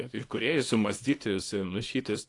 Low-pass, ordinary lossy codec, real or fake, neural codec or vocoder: 10.8 kHz; AAC, 48 kbps; fake; codec, 24 kHz, 0.9 kbps, WavTokenizer, small release